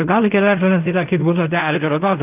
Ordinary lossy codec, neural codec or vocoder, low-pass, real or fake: none; codec, 16 kHz in and 24 kHz out, 0.4 kbps, LongCat-Audio-Codec, fine tuned four codebook decoder; 3.6 kHz; fake